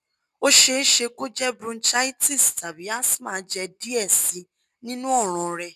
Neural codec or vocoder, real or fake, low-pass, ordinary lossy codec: none; real; 14.4 kHz; none